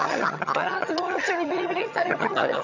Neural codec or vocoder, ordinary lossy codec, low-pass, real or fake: vocoder, 22.05 kHz, 80 mel bands, HiFi-GAN; none; 7.2 kHz; fake